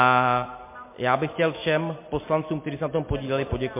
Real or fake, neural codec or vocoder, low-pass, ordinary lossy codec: real; none; 3.6 kHz; MP3, 24 kbps